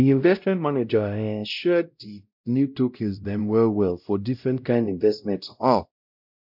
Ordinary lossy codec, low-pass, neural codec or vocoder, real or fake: none; 5.4 kHz; codec, 16 kHz, 0.5 kbps, X-Codec, WavLM features, trained on Multilingual LibriSpeech; fake